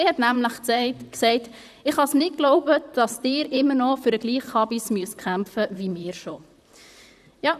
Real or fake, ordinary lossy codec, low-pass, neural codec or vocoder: fake; none; 14.4 kHz; vocoder, 44.1 kHz, 128 mel bands, Pupu-Vocoder